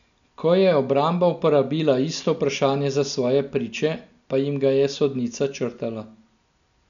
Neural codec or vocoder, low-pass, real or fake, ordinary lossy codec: none; 7.2 kHz; real; none